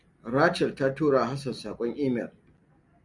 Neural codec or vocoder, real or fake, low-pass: none; real; 10.8 kHz